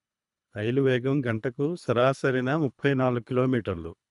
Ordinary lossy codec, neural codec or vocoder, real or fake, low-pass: none; codec, 24 kHz, 3 kbps, HILCodec; fake; 10.8 kHz